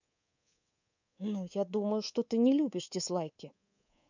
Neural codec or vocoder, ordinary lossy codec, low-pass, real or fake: codec, 24 kHz, 3.1 kbps, DualCodec; none; 7.2 kHz; fake